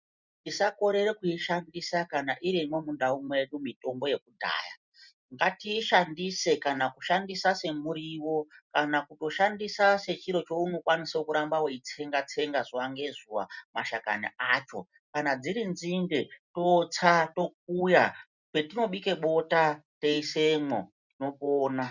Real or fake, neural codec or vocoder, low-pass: real; none; 7.2 kHz